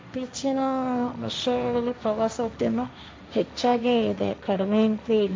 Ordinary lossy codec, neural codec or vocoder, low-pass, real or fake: none; codec, 16 kHz, 1.1 kbps, Voila-Tokenizer; none; fake